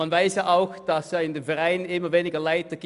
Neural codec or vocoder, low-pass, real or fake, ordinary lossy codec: none; 10.8 kHz; real; none